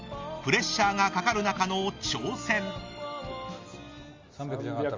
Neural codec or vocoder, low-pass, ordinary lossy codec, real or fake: none; 7.2 kHz; Opus, 32 kbps; real